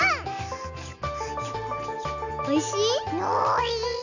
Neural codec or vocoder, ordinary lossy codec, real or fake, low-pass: none; none; real; 7.2 kHz